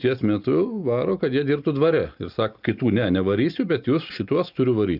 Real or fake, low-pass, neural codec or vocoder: real; 5.4 kHz; none